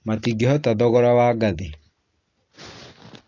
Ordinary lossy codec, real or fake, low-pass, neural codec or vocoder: AAC, 32 kbps; real; 7.2 kHz; none